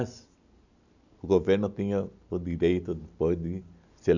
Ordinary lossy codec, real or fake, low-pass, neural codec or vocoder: none; real; 7.2 kHz; none